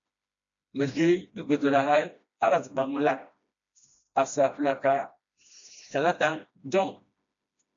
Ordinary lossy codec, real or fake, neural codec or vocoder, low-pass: MP3, 64 kbps; fake; codec, 16 kHz, 1 kbps, FreqCodec, smaller model; 7.2 kHz